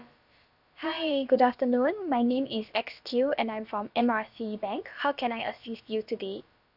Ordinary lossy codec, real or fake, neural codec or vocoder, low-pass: none; fake; codec, 16 kHz, about 1 kbps, DyCAST, with the encoder's durations; 5.4 kHz